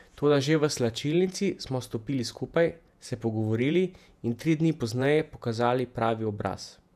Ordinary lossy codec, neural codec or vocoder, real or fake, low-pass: none; vocoder, 48 kHz, 128 mel bands, Vocos; fake; 14.4 kHz